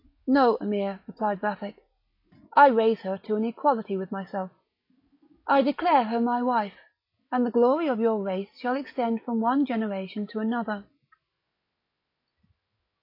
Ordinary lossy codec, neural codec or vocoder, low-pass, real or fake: AAC, 32 kbps; none; 5.4 kHz; real